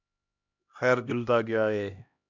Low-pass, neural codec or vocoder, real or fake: 7.2 kHz; codec, 16 kHz, 1 kbps, X-Codec, HuBERT features, trained on LibriSpeech; fake